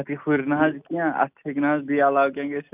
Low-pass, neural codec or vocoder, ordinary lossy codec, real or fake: 3.6 kHz; none; none; real